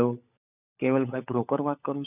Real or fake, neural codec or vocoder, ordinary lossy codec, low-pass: fake; codec, 16 kHz, 16 kbps, FunCodec, trained on LibriTTS, 50 frames a second; none; 3.6 kHz